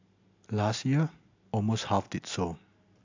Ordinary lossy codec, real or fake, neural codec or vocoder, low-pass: AAC, 48 kbps; real; none; 7.2 kHz